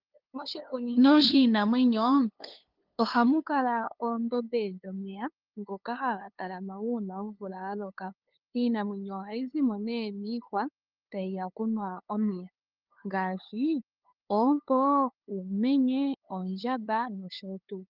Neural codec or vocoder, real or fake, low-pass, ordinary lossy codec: codec, 16 kHz, 2 kbps, FunCodec, trained on LibriTTS, 25 frames a second; fake; 5.4 kHz; Opus, 32 kbps